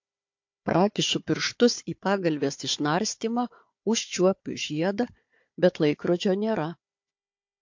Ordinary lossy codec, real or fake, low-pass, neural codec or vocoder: MP3, 48 kbps; fake; 7.2 kHz; codec, 16 kHz, 4 kbps, FunCodec, trained on Chinese and English, 50 frames a second